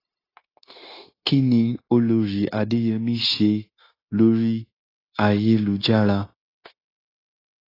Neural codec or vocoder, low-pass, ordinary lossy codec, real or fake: codec, 16 kHz, 0.9 kbps, LongCat-Audio-Codec; 5.4 kHz; AAC, 24 kbps; fake